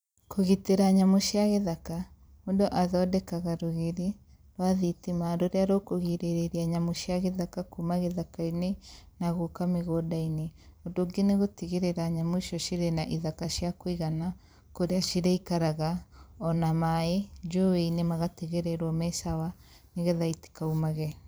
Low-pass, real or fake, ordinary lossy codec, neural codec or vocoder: none; fake; none; vocoder, 44.1 kHz, 128 mel bands every 256 samples, BigVGAN v2